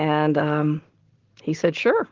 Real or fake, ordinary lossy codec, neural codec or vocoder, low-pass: real; Opus, 16 kbps; none; 7.2 kHz